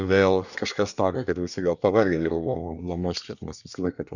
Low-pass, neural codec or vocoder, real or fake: 7.2 kHz; codec, 24 kHz, 1 kbps, SNAC; fake